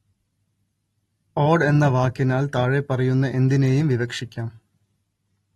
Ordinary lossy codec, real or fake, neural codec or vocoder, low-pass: AAC, 32 kbps; real; none; 19.8 kHz